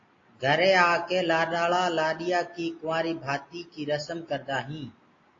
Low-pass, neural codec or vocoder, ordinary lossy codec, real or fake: 7.2 kHz; none; AAC, 32 kbps; real